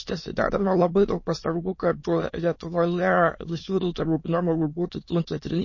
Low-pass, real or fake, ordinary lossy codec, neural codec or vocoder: 7.2 kHz; fake; MP3, 32 kbps; autoencoder, 22.05 kHz, a latent of 192 numbers a frame, VITS, trained on many speakers